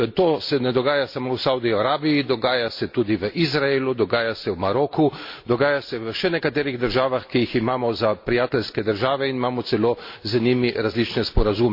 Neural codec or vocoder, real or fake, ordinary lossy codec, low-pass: none; real; MP3, 32 kbps; 5.4 kHz